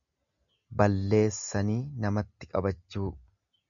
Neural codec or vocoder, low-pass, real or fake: none; 7.2 kHz; real